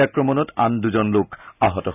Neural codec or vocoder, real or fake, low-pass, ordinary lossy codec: none; real; 3.6 kHz; none